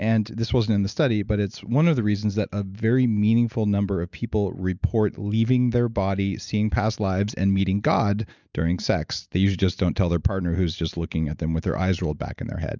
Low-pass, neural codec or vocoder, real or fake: 7.2 kHz; none; real